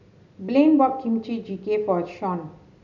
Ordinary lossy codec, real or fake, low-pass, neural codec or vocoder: none; real; 7.2 kHz; none